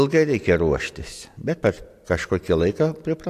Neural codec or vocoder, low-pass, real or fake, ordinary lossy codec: none; 14.4 kHz; real; AAC, 64 kbps